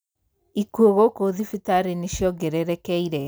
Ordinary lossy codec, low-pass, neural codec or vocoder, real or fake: none; none; none; real